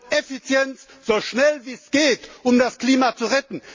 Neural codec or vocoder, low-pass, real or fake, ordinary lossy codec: none; 7.2 kHz; real; MP3, 32 kbps